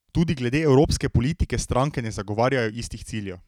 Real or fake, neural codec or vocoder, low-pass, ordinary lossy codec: real; none; 19.8 kHz; none